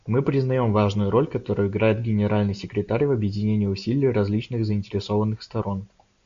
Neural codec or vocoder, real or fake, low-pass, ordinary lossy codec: none; real; 7.2 kHz; AAC, 64 kbps